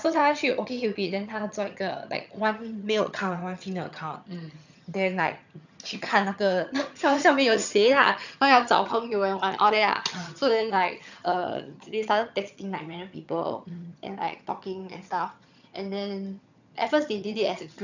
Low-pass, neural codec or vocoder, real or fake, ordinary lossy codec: 7.2 kHz; vocoder, 22.05 kHz, 80 mel bands, HiFi-GAN; fake; none